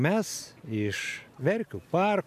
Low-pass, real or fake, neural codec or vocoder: 14.4 kHz; real; none